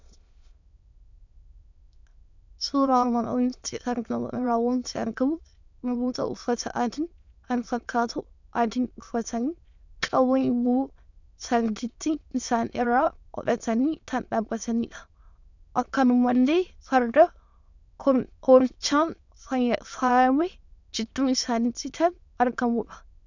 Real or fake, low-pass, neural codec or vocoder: fake; 7.2 kHz; autoencoder, 22.05 kHz, a latent of 192 numbers a frame, VITS, trained on many speakers